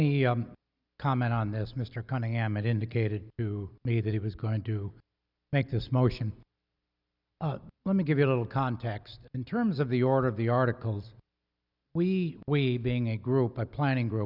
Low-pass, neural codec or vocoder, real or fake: 5.4 kHz; none; real